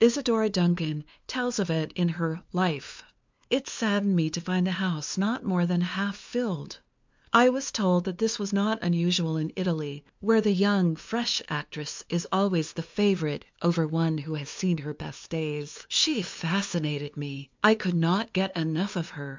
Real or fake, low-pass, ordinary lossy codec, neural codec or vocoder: fake; 7.2 kHz; MP3, 64 kbps; codec, 16 kHz, 2 kbps, FunCodec, trained on LibriTTS, 25 frames a second